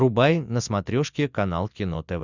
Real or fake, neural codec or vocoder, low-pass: real; none; 7.2 kHz